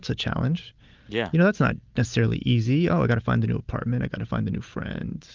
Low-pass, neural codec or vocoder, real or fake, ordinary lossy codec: 7.2 kHz; none; real; Opus, 24 kbps